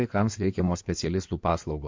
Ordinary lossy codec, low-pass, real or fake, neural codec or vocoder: MP3, 48 kbps; 7.2 kHz; fake; codec, 16 kHz in and 24 kHz out, 2.2 kbps, FireRedTTS-2 codec